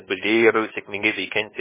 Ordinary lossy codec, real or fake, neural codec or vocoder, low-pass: MP3, 16 kbps; fake; codec, 16 kHz, about 1 kbps, DyCAST, with the encoder's durations; 3.6 kHz